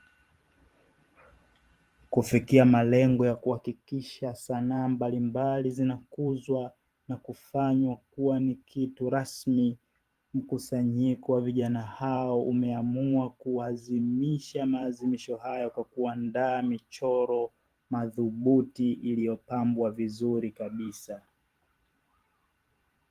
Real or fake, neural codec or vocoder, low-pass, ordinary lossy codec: fake; vocoder, 44.1 kHz, 128 mel bands every 512 samples, BigVGAN v2; 14.4 kHz; Opus, 24 kbps